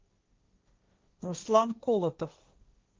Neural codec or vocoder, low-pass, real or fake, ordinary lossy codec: codec, 16 kHz, 1.1 kbps, Voila-Tokenizer; 7.2 kHz; fake; Opus, 24 kbps